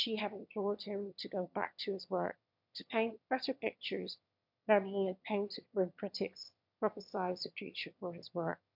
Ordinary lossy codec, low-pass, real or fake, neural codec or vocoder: MP3, 48 kbps; 5.4 kHz; fake; autoencoder, 22.05 kHz, a latent of 192 numbers a frame, VITS, trained on one speaker